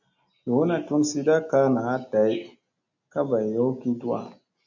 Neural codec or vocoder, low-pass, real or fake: none; 7.2 kHz; real